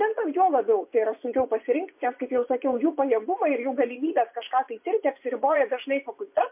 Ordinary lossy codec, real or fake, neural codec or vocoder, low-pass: MP3, 32 kbps; fake; vocoder, 24 kHz, 100 mel bands, Vocos; 3.6 kHz